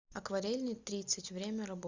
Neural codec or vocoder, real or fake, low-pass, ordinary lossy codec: none; real; 7.2 kHz; Opus, 64 kbps